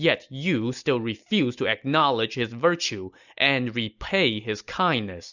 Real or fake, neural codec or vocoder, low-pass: real; none; 7.2 kHz